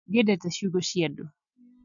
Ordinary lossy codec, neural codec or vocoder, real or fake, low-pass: none; none; real; 7.2 kHz